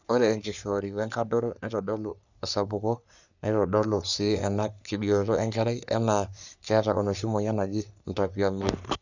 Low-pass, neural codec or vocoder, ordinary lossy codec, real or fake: 7.2 kHz; codec, 44.1 kHz, 3.4 kbps, Pupu-Codec; none; fake